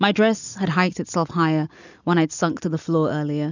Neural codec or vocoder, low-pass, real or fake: none; 7.2 kHz; real